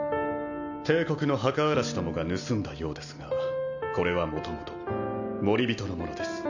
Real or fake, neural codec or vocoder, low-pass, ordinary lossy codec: real; none; 7.2 kHz; none